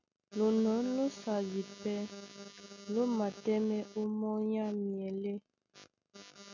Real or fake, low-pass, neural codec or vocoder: real; 7.2 kHz; none